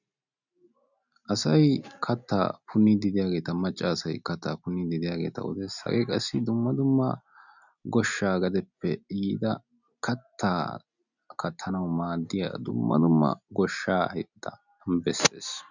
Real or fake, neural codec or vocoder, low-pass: real; none; 7.2 kHz